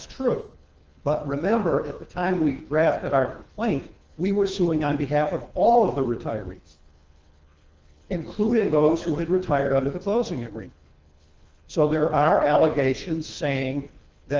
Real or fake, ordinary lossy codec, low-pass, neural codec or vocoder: fake; Opus, 24 kbps; 7.2 kHz; codec, 24 kHz, 3 kbps, HILCodec